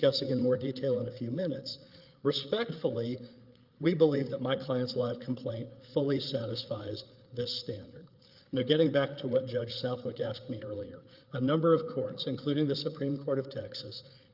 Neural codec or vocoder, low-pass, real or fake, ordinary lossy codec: codec, 16 kHz, 8 kbps, FreqCodec, larger model; 5.4 kHz; fake; Opus, 24 kbps